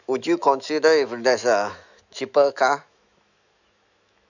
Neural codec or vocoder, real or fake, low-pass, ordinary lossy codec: autoencoder, 48 kHz, 128 numbers a frame, DAC-VAE, trained on Japanese speech; fake; 7.2 kHz; none